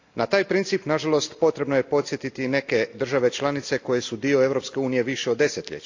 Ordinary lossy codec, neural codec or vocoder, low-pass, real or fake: AAC, 48 kbps; none; 7.2 kHz; real